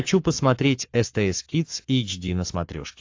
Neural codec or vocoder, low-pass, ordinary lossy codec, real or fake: codec, 44.1 kHz, 7.8 kbps, Pupu-Codec; 7.2 kHz; AAC, 48 kbps; fake